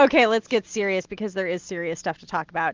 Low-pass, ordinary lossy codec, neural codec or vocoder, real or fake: 7.2 kHz; Opus, 32 kbps; none; real